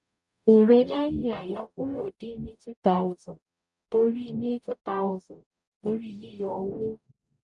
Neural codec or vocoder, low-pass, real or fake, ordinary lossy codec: codec, 44.1 kHz, 0.9 kbps, DAC; 10.8 kHz; fake; none